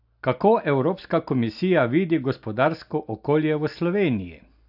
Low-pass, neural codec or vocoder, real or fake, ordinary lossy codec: 5.4 kHz; none; real; AAC, 48 kbps